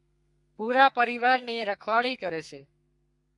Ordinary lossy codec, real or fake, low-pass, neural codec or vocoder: MP3, 96 kbps; fake; 10.8 kHz; codec, 32 kHz, 1.9 kbps, SNAC